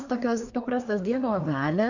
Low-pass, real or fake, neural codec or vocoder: 7.2 kHz; fake; codec, 24 kHz, 1 kbps, SNAC